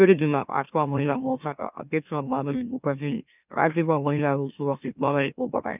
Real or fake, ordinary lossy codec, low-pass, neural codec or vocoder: fake; none; 3.6 kHz; autoencoder, 44.1 kHz, a latent of 192 numbers a frame, MeloTTS